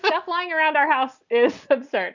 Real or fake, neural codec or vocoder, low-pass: real; none; 7.2 kHz